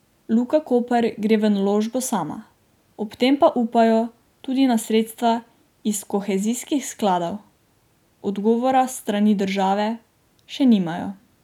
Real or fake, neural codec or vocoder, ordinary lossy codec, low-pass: real; none; none; 19.8 kHz